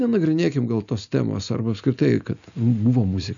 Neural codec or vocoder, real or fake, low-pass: none; real; 7.2 kHz